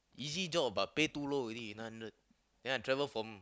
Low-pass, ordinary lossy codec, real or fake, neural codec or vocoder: none; none; real; none